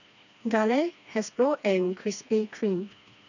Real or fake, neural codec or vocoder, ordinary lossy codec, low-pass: fake; codec, 16 kHz, 2 kbps, FreqCodec, smaller model; none; 7.2 kHz